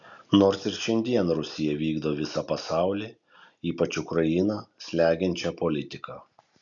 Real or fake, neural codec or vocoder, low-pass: real; none; 7.2 kHz